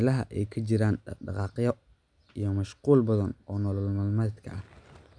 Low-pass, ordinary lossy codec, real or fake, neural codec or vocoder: 9.9 kHz; MP3, 96 kbps; real; none